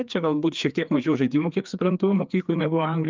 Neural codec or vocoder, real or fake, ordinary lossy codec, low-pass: codec, 16 kHz, 2 kbps, FreqCodec, larger model; fake; Opus, 32 kbps; 7.2 kHz